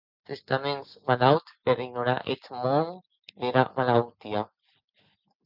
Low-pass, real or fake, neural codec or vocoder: 5.4 kHz; real; none